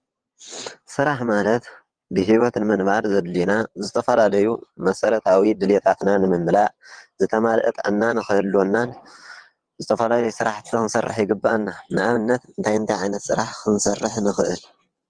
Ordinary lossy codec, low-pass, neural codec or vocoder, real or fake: Opus, 24 kbps; 9.9 kHz; vocoder, 22.05 kHz, 80 mel bands, WaveNeXt; fake